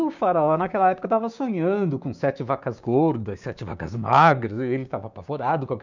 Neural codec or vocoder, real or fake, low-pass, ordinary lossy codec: codec, 16 kHz, 6 kbps, DAC; fake; 7.2 kHz; none